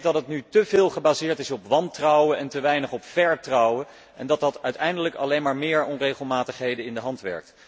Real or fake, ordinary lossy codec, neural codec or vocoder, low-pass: real; none; none; none